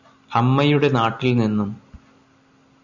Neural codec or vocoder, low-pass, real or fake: none; 7.2 kHz; real